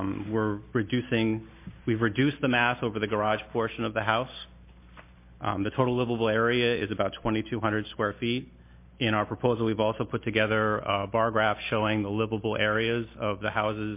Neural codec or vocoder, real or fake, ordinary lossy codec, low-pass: none; real; MP3, 24 kbps; 3.6 kHz